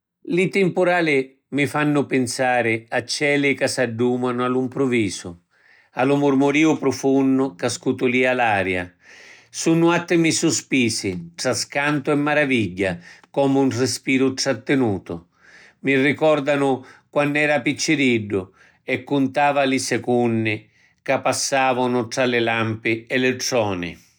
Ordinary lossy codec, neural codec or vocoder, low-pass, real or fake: none; none; none; real